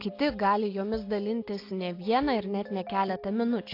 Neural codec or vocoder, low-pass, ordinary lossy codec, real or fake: none; 5.4 kHz; AAC, 32 kbps; real